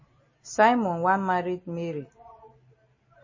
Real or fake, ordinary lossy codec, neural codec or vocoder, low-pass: real; MP3, 32 kbps; none; 7.2 kHz